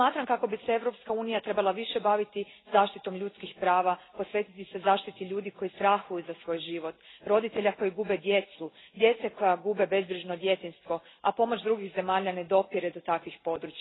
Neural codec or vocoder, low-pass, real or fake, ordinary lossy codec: none; 7.2 kHz; real; AAC, 16 kbps